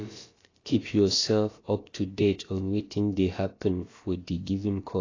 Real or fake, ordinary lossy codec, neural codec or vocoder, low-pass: fake; AAC, 32 kbps; codec, 16 kHz, about 1 kbps, DyCAST, with the encoder's durations; 7.2 kHz